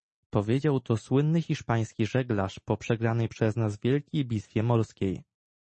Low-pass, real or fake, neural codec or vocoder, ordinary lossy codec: 10.8 kHz; real; none; MP3, 32 kbps